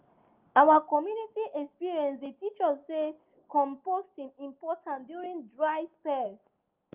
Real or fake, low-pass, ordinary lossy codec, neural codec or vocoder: real; 3.6 kHz; Opus, 24 kbps; none